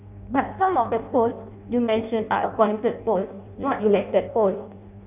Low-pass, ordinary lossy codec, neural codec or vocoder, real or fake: 3.6 kHz; none; codec, 16 kHz in and 24 kHz out, 0.6 kbps, FireRedTTS-2 codec; fake